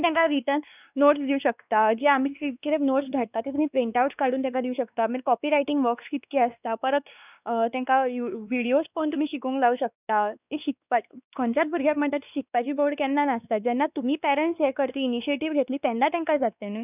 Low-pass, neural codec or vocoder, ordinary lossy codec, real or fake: 3.6 kHz; codec, 16 kHz, 2 kbps, X-Codec, WavLM features, trained on Multilingual LibriSpeech; none; fake